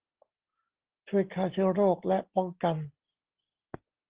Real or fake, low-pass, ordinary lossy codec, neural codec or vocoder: real; 3.6 kHz; Opus, 16 kbps; none